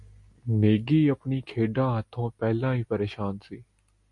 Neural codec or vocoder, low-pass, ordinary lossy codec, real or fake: none; 10.8 kHz; MP3, 48 kbps; real